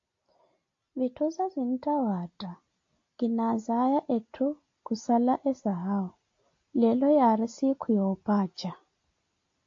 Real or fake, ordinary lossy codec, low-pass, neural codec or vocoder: real; AAC, 48 kbps; 7.2 kHz; none